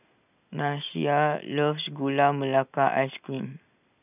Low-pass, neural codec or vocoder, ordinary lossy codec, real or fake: 3.6 kHz; none; none; real